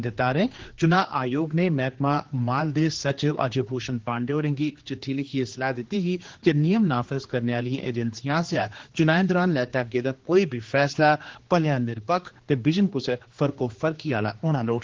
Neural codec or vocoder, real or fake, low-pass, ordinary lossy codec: codec, 16 kHz, 2 kbps, X-Codec, HuBERT features, trained on general audio; fake; 7.2 kHz; Opus, 16 kbps